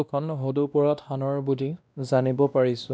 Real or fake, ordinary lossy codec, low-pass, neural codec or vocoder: fake; none; none; codec, 16 kHz, 1 kbps, X-Codec, WavLM features, trained on Multilingual LibriSpeech